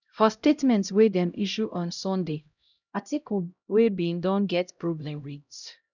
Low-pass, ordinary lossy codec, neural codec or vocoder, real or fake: 7.2 kHz; none; codec, 16 kHz, 0.5 kbps, X-Codec, HuBERT features, trained on LibriSpeech; fake